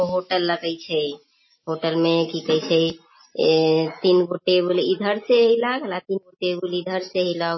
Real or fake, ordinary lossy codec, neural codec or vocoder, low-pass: real; MP3, 24 kbps; none; 7.2 kHz